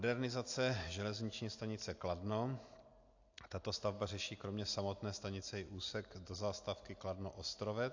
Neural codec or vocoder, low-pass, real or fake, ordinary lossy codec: none; 7.2 kHz; real; AAC, 48 kbps